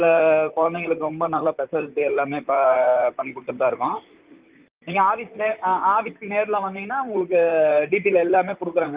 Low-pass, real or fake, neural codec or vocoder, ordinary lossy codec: 3.6 kHz; fake; vocoder, 44.1 kHz, 128 mel bands, Pupu-Vocoder; Opus, 32 kbps